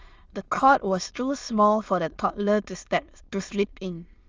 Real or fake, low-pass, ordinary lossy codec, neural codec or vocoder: fake; 7.2 kHz; Opus, 24 kbps; autoencoder, 22.05 kHz, a latent of 192 numbers a frame, VITS, trained on many speakers